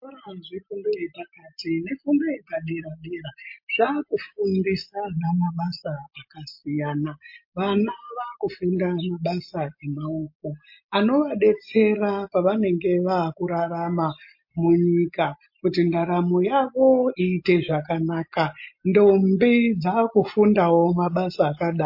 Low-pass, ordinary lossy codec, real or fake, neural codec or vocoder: 5.4 kHz; MP3, 32 kbps; real; none